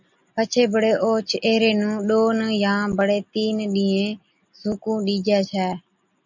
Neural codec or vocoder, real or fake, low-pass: none; real; 7.2 kHz